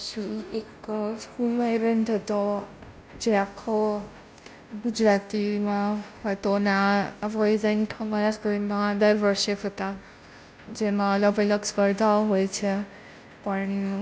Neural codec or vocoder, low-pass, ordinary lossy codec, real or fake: codec, 16 kHz, 0.5 kbps, FunCodec, trained on Chinese and English, 25 frames a second; none; none; fake